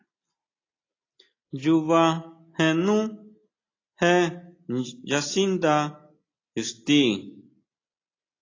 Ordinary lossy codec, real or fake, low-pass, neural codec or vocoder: AAC, 32 kbps; real; 7.2 kHz; none